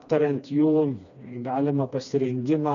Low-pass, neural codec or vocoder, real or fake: 7.2 kHz; codec, 16 kHz, 2 kbps, FreqCodec, smaller model; fake